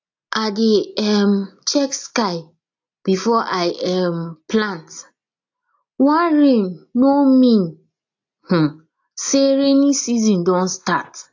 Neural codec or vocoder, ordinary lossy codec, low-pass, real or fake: none; AAC, 48 kbps; 7.2 kHz; real